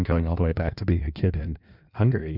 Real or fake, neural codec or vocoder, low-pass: fake; codec, 16 kHz in and 24 kHz out, 1.1 kbps, FireRedTTS-2 codec; 5.4 kHz